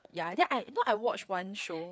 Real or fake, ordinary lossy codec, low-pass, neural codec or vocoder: fake; none; none; codec, 16 kHz, 4 kbps, FreqCodec, larger model